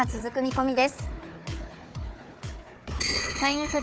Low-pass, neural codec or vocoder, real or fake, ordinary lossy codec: none; codec, 16 kHz, 4 kbps, FunCodec, trained on Chinese and English, 50 frames a second; fake; none